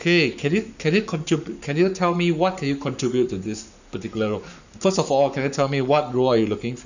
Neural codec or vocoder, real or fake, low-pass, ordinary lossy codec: codec, 44.1 kHz, 7.8 kbps, Pupu-Codec; fake; 7.2 kHz; none